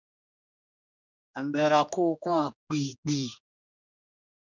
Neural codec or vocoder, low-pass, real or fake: codec, 16 kHz, 1 kbps, X-Codec, HuBERT features, trained on general audio; 7.2 kHz; fake